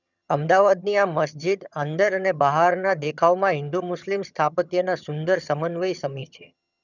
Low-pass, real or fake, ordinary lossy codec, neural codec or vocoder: 7.2 kHz; fake; none; vocoder, 22.05 kHz, 80 mel bands, HiFi-GAN